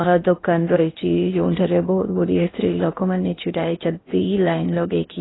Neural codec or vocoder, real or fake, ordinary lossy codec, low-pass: codec, 16 kHz in and 24 kHz out, 0.8 kbps, FocalCodec, streaming, 65536 codes; fake; AAC, 16 kbps; 7.2 kHz